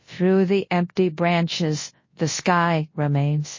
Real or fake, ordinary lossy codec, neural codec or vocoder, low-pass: fake; MP3, 32 kbps; codec, 24 kHz, 0.9 kbps, WavTokenizer, large speech release; 7.2 kHz